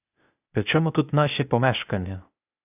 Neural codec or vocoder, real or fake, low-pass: codec, 16 kHz, 0.8 kbps, ZipCodec; fake; 3.6 kHz